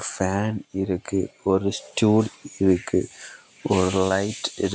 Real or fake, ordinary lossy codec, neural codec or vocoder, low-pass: real; none; none; none